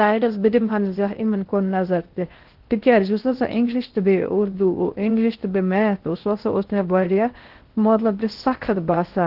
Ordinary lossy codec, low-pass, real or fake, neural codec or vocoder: Opus, 16 kbps; 5.4 kHz; fake; codec, 16 kHz in and 24 kHz out, 0.6 kbps, FocalCodec, streaming, 2048 codes